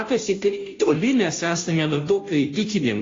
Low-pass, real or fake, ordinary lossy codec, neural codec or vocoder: 7.2 kHz; fake; AAC, 32 kbps; codec, 16 kHz, 0.5 kbps, FunCodec, trained on Chinese and English, 25 frames a second